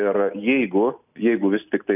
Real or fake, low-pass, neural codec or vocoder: real; 3.6 kHz; none